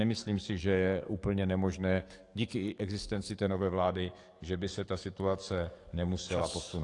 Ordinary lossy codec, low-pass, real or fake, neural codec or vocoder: AAC, 48 kbps; 10.8 kHz; fake; codec, 44.1 kHz, 7.8 kbps, DAC